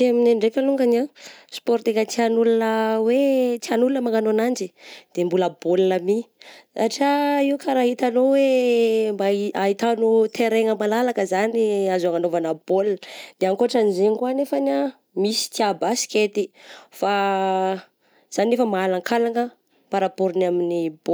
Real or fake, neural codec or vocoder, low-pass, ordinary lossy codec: real; none; none; none